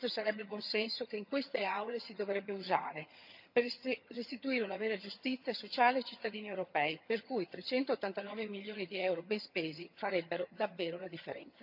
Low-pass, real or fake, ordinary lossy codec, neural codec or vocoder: 5.4 kHz; fake; none; vocoder, 22.05 kHz, 80 mel bands, HiFi-GAN